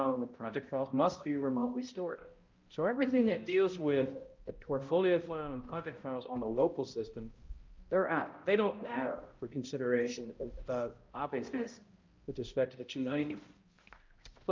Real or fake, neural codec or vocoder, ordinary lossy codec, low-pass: fake; codec, 16 kHz, 0.5 kbps, X-Codec, HuBERT features, trained on balanced general audio; Opus, 24 kbps; 7.2 kHz